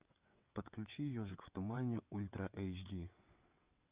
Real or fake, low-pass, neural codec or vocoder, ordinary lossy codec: fake; 3.6 kHz; vocoder, 22.05 kHz, 80 mel bands, WaveNeXt; Opus, 64 kbps